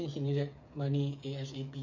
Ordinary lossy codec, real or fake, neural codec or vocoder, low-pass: MP3, 64 kbps; fake; codec, 16 kHz, 8 kbps, FreqCodec, smaller model; 7.2 kHz